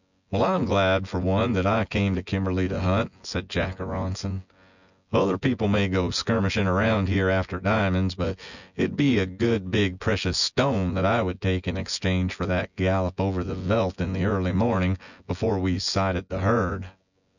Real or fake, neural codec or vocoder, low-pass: fake; vocoder, 24 kHz, 100 mel bands, Vocos; 7.2 kHz